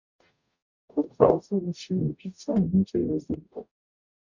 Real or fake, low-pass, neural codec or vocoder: fake; 7.2 kHz; codec, 44.1 kHz, 0.9 kbps, DAC